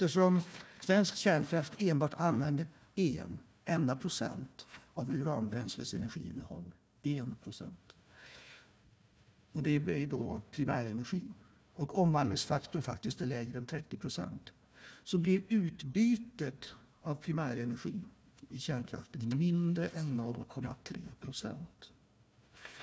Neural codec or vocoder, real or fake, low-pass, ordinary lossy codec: codec, 16 kHz, 1 kbps, FunCodec, trained on Chinese and English, 50 frames a second; fake; none; none